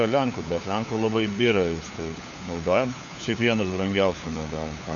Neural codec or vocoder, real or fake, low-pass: codec, 16 kHz, 4 kbps, FunCodec, trained on LibriTTS, 50 frames a second; fake; 7.2 kHz